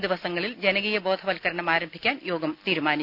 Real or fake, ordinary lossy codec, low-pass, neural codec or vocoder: real; none; 5.4 kHz; none